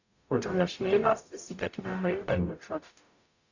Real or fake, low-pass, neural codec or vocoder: fake; 7.2 kHz; codec, 44.1 kHz, 0.9 kbps, DAC